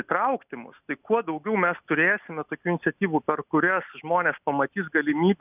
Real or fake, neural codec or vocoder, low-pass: real; none; 3.6 kHz